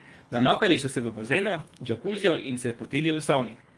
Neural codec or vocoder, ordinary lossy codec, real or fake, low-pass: codec, 24 kHz, 1.5 kbps, HILCodec; Opus, 32 kbps; fake; 10.8 kHz